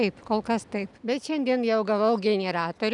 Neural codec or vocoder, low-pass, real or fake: none; 10.8 kHz; real